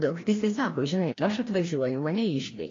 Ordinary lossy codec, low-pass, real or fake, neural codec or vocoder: AAC, 32 kbps; 7.2 kHz; fake; codec, 16 kHz, 1 kbps, FreqCodec, larger model